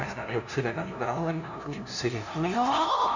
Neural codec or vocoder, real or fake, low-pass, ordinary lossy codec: codec, 16 kHz, 0.5 kbps, FunCodec, trained on LibriTTS, 25 frames a second; fake; 7.2 kHz; none